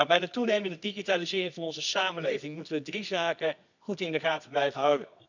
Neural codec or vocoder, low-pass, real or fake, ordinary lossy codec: codec, 24 kHz, 0.9 kbps, WavTokenizer, medium music audio release; 7.2 kHz; fake; none